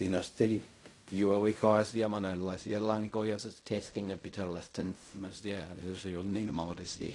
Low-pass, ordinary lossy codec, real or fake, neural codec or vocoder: 10.8 kHz; AAC, 48 kbps; fake; codec, 16 kHz in and 24 kHz out, 0.4 kbps, LongCat-Audio-Codec, fine tuned four codebook decoder